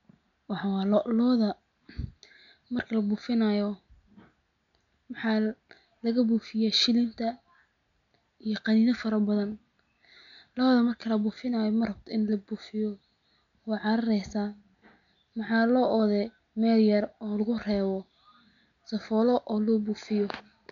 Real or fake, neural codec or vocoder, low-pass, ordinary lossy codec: real; none; 7.2 kHz; none